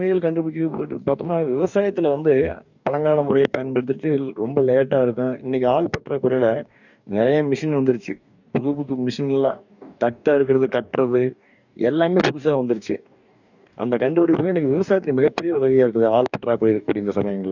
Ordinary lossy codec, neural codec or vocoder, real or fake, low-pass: none; codec, 44.1 kHz, 2.6 kbps, DAC; fake; 7.2 kHz